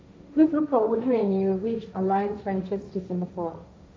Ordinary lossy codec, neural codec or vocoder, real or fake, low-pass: none; codec, 16 kHz, 1.1 kbps, Voila-Tokenizer; fake; none